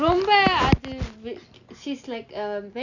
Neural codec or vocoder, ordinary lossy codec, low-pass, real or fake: none; none; 7.2 kHz; real